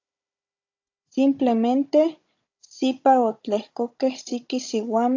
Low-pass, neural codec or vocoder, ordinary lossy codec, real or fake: 7.2 kHz; codec, 16 kHz, 16 kbps, FunCodec, trained on Chinese and English, 50 frames a second; AAC, 48 kbps; fake